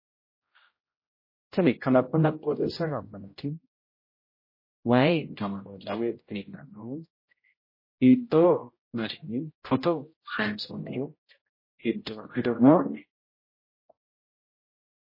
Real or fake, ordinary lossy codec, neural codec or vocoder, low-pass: fake; MP3, 24 kbps; codec, 16 kHz, 0.5 kbps, X-Codec, HuBERT features, trained on general audio; 5.4 kHz